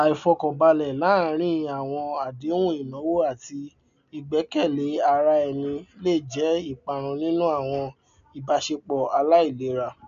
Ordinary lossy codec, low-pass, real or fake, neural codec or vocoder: none; 7.2 kHz; real; none